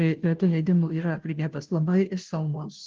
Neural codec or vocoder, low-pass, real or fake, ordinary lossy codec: codec, 16 kHz, 0.5 kbps, FunCodec, trained on Chinese and English, 25 frames a second; 7.2 kHz; fake; Opus, 16 kbps